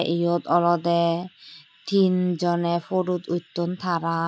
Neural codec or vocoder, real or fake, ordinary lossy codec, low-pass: none; real; none; none